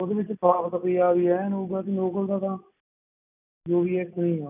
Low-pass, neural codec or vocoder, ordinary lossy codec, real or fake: 3.6 kHz; none; none; real